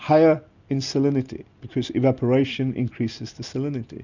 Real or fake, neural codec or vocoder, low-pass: real; none; 7.2 kHz